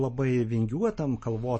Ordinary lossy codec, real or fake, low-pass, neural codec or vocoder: MP3, 32 kbps; real; 9.9 kHz; none